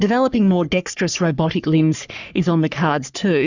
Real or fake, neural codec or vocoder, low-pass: fake; codec, 44.1 kHz, 3.4 kbps, Pupu-Codec; 7.2 kHz